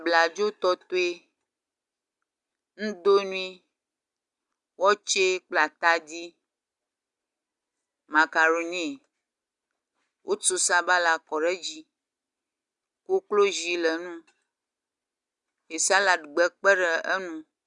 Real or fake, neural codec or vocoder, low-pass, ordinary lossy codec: real; none; 10.8 kHz; Opus, 64 kbps